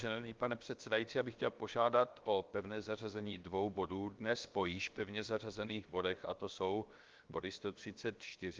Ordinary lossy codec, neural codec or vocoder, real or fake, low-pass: Opus, 32 kbps; codec, 16 kHz, 0.7 kbps, FocalCodec; fake; 7.2 kHz